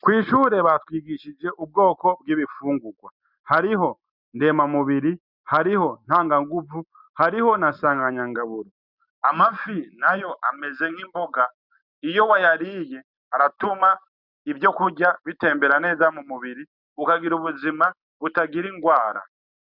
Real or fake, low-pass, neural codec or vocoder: real; 5.4 kHz; none